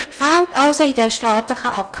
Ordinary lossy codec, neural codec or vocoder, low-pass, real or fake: none; codec, 16 kHz in and 24 kHz out, 0.8 kbps, FocalCodec, streaming, 65536 codes; 9.9 kHz; fake